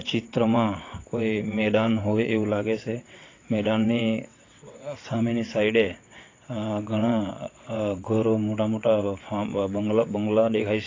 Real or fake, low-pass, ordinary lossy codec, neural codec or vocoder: fake; 7.2 kHz; AAC, 32 kbps; vocoder, 22.05 kHz, 80 mel bands, WaveNeXt